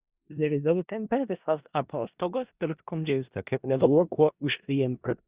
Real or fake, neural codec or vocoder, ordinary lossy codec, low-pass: fake; codec, 16 kHz in and 24 kHz out, 0.4 kbps, LongCat-Audio-Codec, four codebook decoder; Opus, 64 kbps; 3.6 kHz